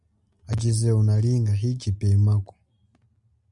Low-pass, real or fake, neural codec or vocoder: 10.8 kHz; real; none